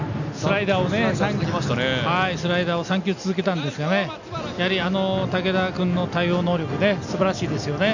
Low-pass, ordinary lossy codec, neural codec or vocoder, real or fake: 7.2 kHz; none; none; real